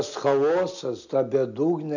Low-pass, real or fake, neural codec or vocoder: 7.2 kHz; real; none